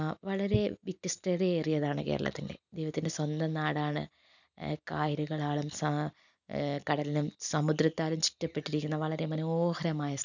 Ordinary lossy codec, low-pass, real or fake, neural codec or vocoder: none; 7.2 kHz; real; none